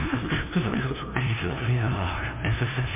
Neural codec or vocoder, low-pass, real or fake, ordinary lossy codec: codec, 16 kHz, 0.5 kbps, FunCodec, trained on LibriTTS, 25 frames a second; 3.6 kHz; fake; MP3, 24 kbps